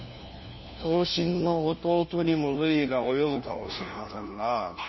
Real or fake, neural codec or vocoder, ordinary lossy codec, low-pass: fake; codec, 16 kHz, 1 kbps, FunCodec, trained on LibriTTS, 50 frames a second; MP3, 24 kbps; 7.2 kHz